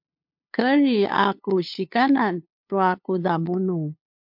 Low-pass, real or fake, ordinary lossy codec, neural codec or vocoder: 5.4 kHz; fake; MP3, 48 kbps; codec, 16 kHz, 2 kbps, FunCodec, trained on LibriTTS, 25 frames a second